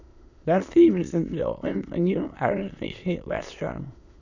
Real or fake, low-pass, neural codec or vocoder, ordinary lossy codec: fake; 7.2 kHz; autoencoder, 22.05 kHz, a latent of 192 numbers a frame, VITS, trained on many speakers; none